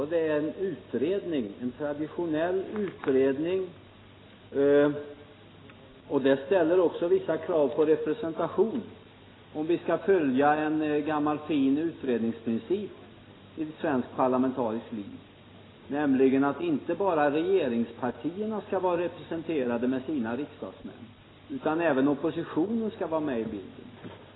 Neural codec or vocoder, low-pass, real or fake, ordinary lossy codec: none; 7.2 kHz; real; AAC, 16 kbps